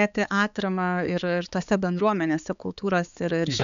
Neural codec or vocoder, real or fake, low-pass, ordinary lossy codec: codec, 16 kHz, 4 kbps, X-Codec, HuBERT features, trained on balanced general audio; fake; 7.2 kHz; MP3, 96 kbps